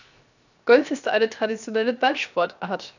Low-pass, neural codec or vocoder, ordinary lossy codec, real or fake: 7.2 kHz; codec, 16 kHz, 0.7 kbps, FocalCodec; none; fake